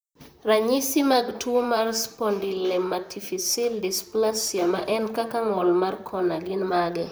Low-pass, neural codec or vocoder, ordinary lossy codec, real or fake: none; vocoder, 44.1 kHz, 128 mel bands, Pupu-Vocoder; none; fake